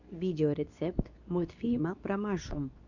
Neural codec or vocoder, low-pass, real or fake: codec, 24 kHz, 0.9 kbps, WavTokenizer, medium speech release version 2; 7.2 kHz; fake